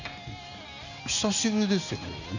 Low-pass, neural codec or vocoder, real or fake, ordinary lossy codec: 7.2 kHz; none; real; none